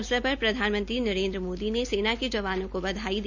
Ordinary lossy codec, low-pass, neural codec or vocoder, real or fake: none; 7.2 kHz; none; real